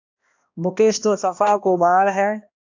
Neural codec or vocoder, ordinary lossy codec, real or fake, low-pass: codec, 16 kHz, 1 kbps, X-Codec, HuBERT features, trained on balanced general audio; AAC, 48 kbps; fake; 7.2 kHz